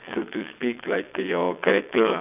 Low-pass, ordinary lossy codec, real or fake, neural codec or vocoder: 3.6 kHz; none; fake; vocoder, 22.05 kHz, 80 mel bands, WaveNeXt